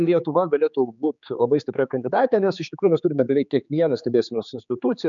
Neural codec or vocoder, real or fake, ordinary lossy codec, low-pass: codec, 16 kHz, 4 kbps, X-Codec, HuBERT features, trained on general audio; fake; MP3, 96 kbps; 7.2 kHz